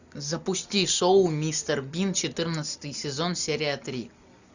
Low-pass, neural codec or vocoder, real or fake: 7.2 kHz; none; real